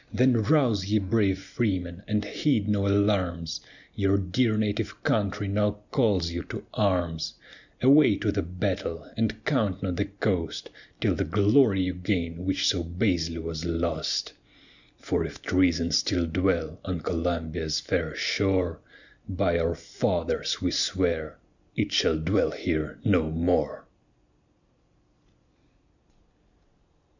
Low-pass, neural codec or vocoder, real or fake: 7.2 kHz; none; real